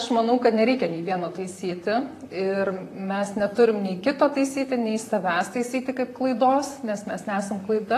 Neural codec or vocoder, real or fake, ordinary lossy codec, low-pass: vocoder, 44.1 kHz, 128 mel bands, Pupu-Vocoder; fake; AAC, 48 kbps; 14.4 kHz